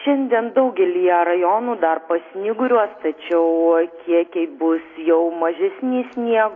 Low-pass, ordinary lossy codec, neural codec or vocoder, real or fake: 7.2 kHz; AAC, 32 kbps; none; real